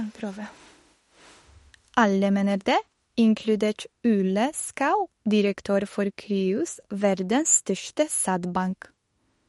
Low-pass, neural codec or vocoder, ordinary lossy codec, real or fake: 19.8 kHz; autoencoder, 48 kHz, 32 numbers a frame, DAC-VAE, trained on Japanese speech; MP3, 48 kbps; fake